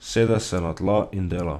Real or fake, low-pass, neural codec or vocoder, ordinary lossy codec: fake; 14.4 kHz; vocoder, 44.1 kHz, 128 mel bands every 256 samples, BigVGAN v2; none